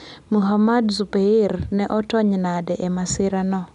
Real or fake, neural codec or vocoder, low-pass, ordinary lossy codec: real; none; 10.8 kHz; none